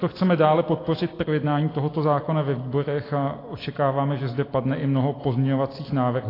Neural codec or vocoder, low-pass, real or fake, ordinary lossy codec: none; 5.4 kHz; real; AAC, 24 kbps